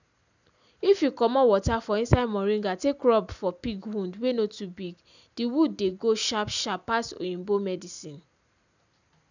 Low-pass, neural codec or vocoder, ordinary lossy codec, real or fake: 7.2 kHz; none; none; real